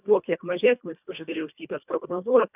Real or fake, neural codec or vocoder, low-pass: fake; codec, 24 kHz, 1.5 kbps, HILCodec; 3.6 kHz